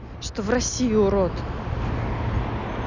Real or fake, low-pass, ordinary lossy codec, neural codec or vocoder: real; 7.2 kHz; none; none